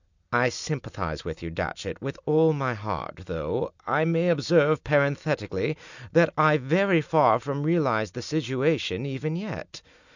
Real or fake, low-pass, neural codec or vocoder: real; 7.2 kHz; none